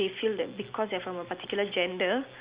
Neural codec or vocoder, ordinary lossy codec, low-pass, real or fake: none; Opus, 64 kbps; 3.6 kHz; real